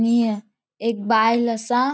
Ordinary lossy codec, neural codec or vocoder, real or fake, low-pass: none; none; real; none